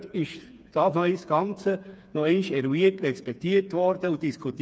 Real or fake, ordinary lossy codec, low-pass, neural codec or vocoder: fake; none; none; codec, 16 kHz, 4 kbps, FreqCodec, smaller model